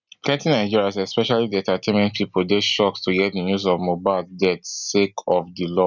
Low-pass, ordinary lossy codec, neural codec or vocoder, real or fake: 7.2 kHz; none; none; real